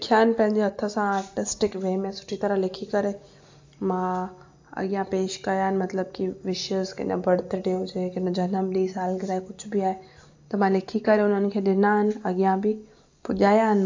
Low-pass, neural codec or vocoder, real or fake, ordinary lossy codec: 7.2 kHz; none; real; AAC, 48 kbps